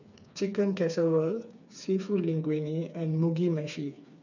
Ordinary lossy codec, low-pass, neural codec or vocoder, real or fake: none; 7.2 kHz; codec, 16 kHz, 4 kbps, FreqCodec, smaller model; fake